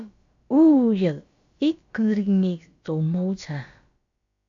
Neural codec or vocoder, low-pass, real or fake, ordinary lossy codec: codec, 16 kHz, about 1 kbps, DyCAST, with the encoder's durations; 7.2 kHz; fake; AAC, 64 kbps